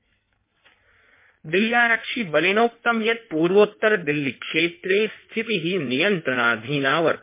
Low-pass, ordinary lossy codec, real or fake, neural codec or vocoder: 3.6 kHz; MP3, 24 kbps; fake; codec, 16 kHz in and 24 kHz out, 1.1 kbps, FireRedTTS-2 codec